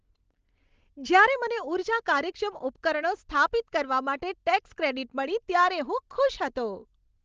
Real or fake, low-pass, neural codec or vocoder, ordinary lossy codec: real; 7.2 kHz; none; Opus, 16 kbps